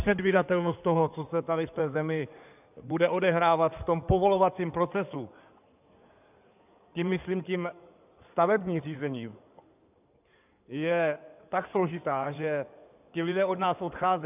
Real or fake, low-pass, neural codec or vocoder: fake; 3.6 kHz; codec, 16 kHz in and 24 kHz out, 2.2 kbps, FireRedTTS-2 codec